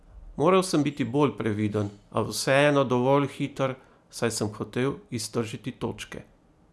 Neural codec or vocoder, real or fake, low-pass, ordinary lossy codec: none; real; none; none